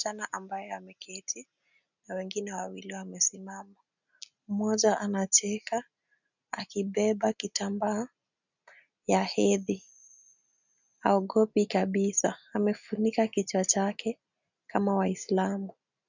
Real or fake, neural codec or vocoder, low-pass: real; none; 7.2 kHz